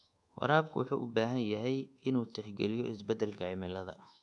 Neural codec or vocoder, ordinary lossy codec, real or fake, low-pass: codec, 24 kHz, 1.2 kbps, DualCodec; AAC, 64 kbps; fake; 10.8 kHz